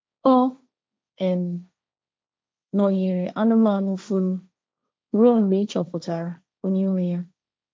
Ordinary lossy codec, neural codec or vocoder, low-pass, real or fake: none; codec, 16 kHz, 1.1 kbps, Voila-Tokenizer; none; fake